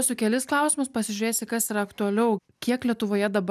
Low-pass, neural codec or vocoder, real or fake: 14.4 kHz; none; real